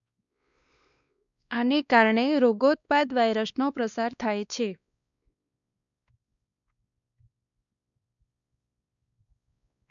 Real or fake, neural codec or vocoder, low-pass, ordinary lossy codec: fake; codec, 16 kHz, 2 kbps, X-Codec, WavLM features, trained on Multilingual LibriSpeech; 7.2 kHz; none